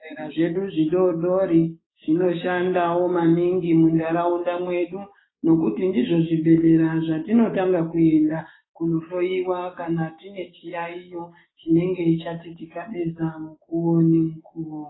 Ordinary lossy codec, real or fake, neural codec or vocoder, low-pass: AAC, 16 kbps; fake; codec, 16 kHz, 6 kbps, DAC; 7.2 kHz